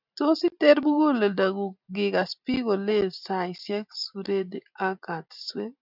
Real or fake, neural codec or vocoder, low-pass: real; none; 5.4 kHz